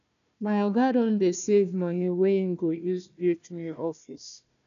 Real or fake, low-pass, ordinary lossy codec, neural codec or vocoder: fake; 7.2 kHz; none; codec, 16 kHz, 1 kbps, FunCodec, trained on Chinese and English, 50 frames a second